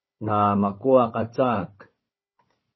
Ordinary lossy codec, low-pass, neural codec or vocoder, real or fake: MP3, 24 kbps; 7.2 kHz; codec, 16 kHz, 4 kbps, FunCodec, trained on Chinese and English, 50 frames a second; fake